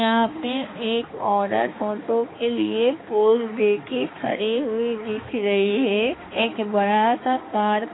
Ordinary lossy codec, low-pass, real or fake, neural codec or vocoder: AAC, 16 kbps; 7.2 kHz; fake; codec, 16 kHz, 2 kbps, X-Codec, HuBERT features, trained on balanced general audio